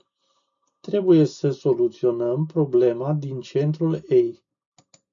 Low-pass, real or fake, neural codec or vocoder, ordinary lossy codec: 7.2 kHz; real; none; MP3, 64 kbps